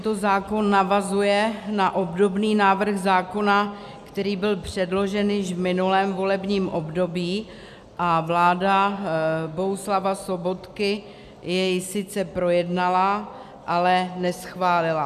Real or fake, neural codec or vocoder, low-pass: real; none; 14.4 kHz